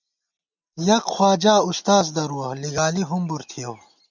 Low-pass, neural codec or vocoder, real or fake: 7.2 kHz; none; real